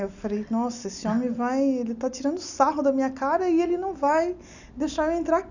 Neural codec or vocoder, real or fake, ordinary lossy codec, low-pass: none; real; none; 7.2 kHz